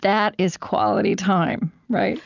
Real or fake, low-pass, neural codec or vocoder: real; 7.2 kHz; none